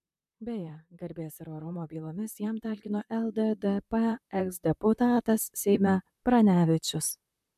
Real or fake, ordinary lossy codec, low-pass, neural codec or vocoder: fake; MP3, 96 kbps; 14.4 kHz; vocoder, 44.1 kHz, 128 mel bands, Pupu-Vocoder